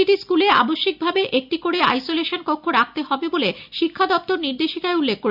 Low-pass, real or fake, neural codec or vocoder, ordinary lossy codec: 5.4 kHz; real; none; none